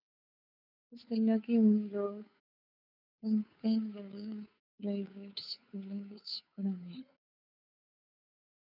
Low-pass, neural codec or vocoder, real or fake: 5.4 kHz; codec, 16 kHz, 2 kbps, FunCodec, trained on Chinese and English, 25 frames a second; fake